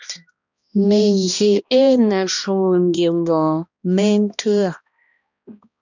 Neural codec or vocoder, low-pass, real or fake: codec, 16 kHz, 1 kbps, X-Codec, HuBERT features, trained on balanced general audio; 7.2 kHz; fake